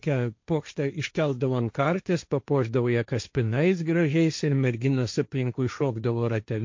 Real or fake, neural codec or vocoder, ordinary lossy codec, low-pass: fake; codec, 16 kHz, 1.1 kbps, Voila-Tokenizer; MP3, 48 kbps; 7.2 kHz